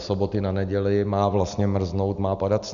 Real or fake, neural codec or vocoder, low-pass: real; none; 7.2 kHz